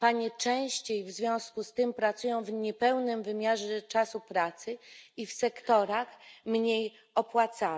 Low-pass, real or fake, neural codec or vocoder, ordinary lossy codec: none; real; none; none